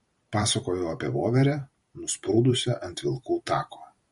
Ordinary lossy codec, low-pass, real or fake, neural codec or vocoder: MP3, 48 kbps; 19.8 kHz; fake; vocoder, 44.1 kHz, 128 mel bands every 512 samples, BigVGAN v2